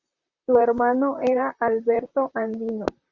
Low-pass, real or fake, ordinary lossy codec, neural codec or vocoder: 7.2 kHz; fake; Opus, 64 kbps; vocoder, 44.1 kHz, 128 mel bands, Pupu-Vocoder